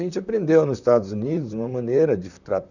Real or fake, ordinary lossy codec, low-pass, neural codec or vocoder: fake; none; 7.2 kHz; vocoder, 44.1 kHz, 128 mel bands, Pupu-Vocoder